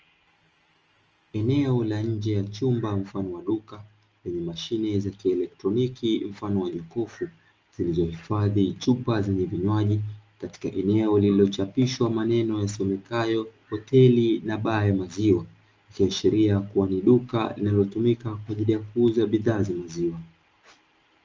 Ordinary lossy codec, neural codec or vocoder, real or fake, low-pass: Opus, 24 kbps; none; real; 7.2 kHz